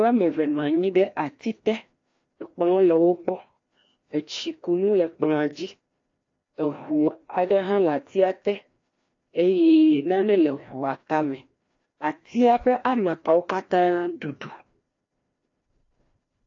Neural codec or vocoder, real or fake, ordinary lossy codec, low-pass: codec, 16 kHz, 1 kbps, FreqCodec, larger model; fake; AAC, 48 kbps; 7.2 kHz